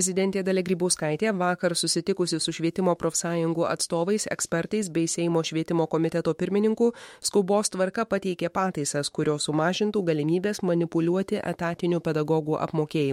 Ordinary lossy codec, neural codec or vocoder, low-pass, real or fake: MP3, 64 kbps; autoencoder, 48 kHz, 128 numbers a frame, DAC-VAE, trained on Japanese speech; 19.8 kHz; fake